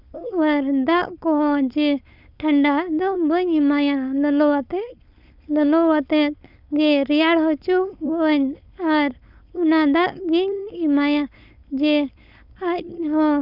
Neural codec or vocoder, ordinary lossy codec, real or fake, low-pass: codec, 16 kHz, 4.8 kbps, FACodec; none; fake; 5.4 kHz